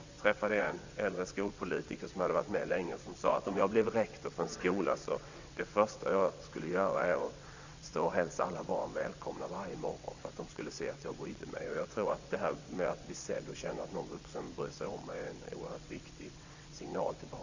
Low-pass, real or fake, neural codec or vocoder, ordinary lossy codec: 7.2 kHz; fake; vocoder, 22.05 kHz, 80 mel bands, WaveNeXt; Opus, 64 kbps